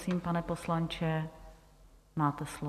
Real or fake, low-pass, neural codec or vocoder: real; 14.4 kHz; none